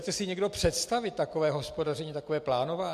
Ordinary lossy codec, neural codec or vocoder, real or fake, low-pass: MP3, 64 kbps; none; real; 14.4 kHz